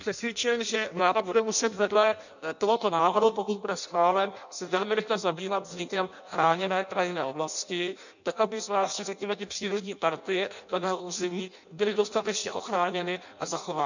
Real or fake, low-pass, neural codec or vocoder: fake; 7.2 kHz; codec, 16 kHz in and 24 kHz out, 0.6 kbps, FireRedTTS-2 codec